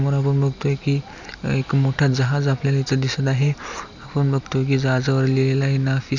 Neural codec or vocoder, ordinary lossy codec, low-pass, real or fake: none; none; 7.2 kHz; real